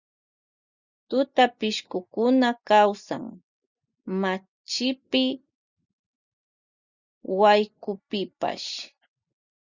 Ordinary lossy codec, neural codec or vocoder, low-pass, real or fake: Opus, 64 kbps; none; 7.2 kHz; real